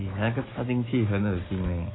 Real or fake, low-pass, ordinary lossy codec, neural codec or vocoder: real; 7.2 kHz; AAC, 16 kbps; none